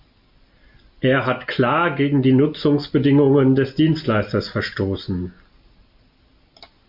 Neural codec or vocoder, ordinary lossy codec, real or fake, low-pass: none; MP3, 48 kbps; real; 5.4 kHz